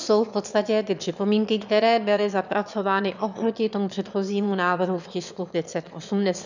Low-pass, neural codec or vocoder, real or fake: 7.2 kHz; autoencoder, 22.05 kHz, a latent of 192 numbers a frame, VITS, trained on one speaker; fake